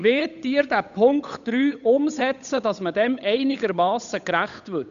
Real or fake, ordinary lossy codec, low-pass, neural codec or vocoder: fake; none; 7.2 kHz; codec, 16 kHz, 8 kbps, FunCodec, trained on Chinese and English, 25 frames a second